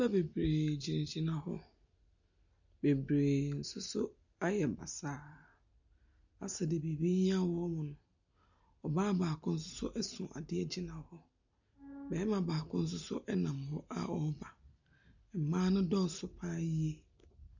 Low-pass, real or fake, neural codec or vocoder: 7.2 kHz; real; none